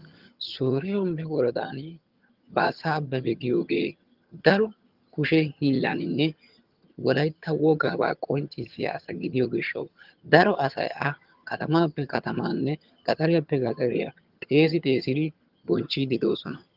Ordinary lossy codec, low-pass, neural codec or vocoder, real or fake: Opus, 32 kbps; 5.4 kHz; vocoder, 22.05 kHz, 80 mel bands, HiFi-GAN; fake